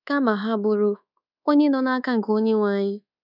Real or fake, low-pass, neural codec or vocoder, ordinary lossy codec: fake; 5.4 kHz; codec, 24 kHz, 1.2 kbps, DualCodec; none